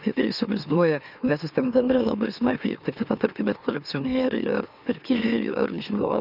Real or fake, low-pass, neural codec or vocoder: fake; 5.4 kHz; autoencoder, 44.1 kHz, a latent of 192 numbers a frame, MeloTTS